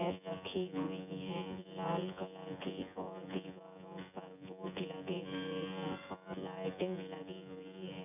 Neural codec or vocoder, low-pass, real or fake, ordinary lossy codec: vocoder, 24 kHz, 100 mel bands, Vocos; 3.6 kHz; fake; none